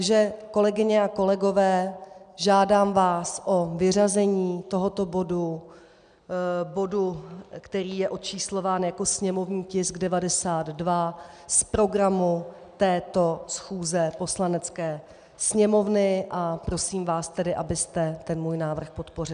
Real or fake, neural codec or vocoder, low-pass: real; none; 9.9 kHz